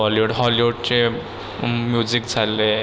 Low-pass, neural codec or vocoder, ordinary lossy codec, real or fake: none; none; none; real